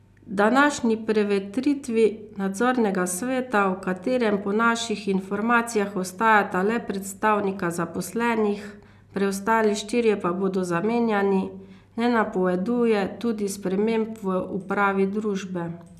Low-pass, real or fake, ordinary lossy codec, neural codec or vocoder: 14.4 kHz; real; none; none